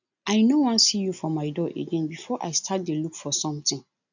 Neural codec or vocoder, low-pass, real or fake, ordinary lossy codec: none; 7.2 kHz; real; none